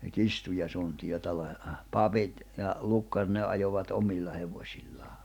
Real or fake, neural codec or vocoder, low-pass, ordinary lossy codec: real; none; 19.8 kHz; none